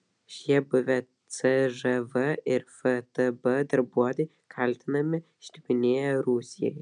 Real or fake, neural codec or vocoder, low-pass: real; none; 9.9 kHz